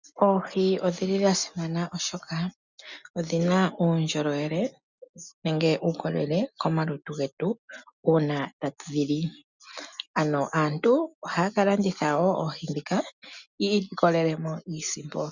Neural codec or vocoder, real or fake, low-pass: none; real; 7.2 kHz